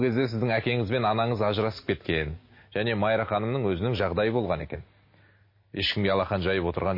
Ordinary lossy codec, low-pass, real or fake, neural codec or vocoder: MP3, 24 kbps; 5.4 kHz; real; none